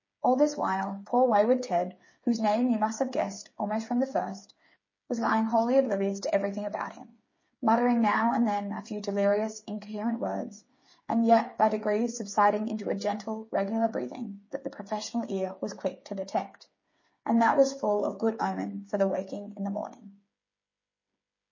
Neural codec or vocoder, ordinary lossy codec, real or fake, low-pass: codec, 16 kHz, 8 kbps, FreqCodec, smaller model; MP3, 32 kbps; fake; 7.2 kHz